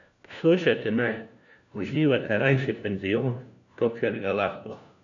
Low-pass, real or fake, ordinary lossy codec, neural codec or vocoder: 7.2 kHz; fake; none; codec, 16 kHz, 1 kbps, FunCodec, trained on LibriTTS, 50 frames a second